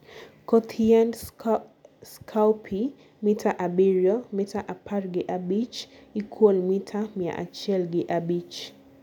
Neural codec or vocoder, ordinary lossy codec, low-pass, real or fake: none; none; 19.8 kHz; real